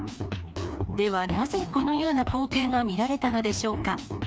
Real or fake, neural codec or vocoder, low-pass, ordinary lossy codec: fake; codec, 16 kHz, 2 kbps, FreqCodec, larger model; none; none